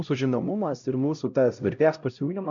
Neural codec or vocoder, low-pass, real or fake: codec, 16 kHz, 0.5 kbps, X-Codec, HuBERT features, trained on LibriSpeech; 7.2 kHz; fake